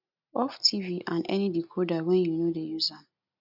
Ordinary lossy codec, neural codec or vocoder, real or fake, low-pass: none; none; real; 5.4 kHz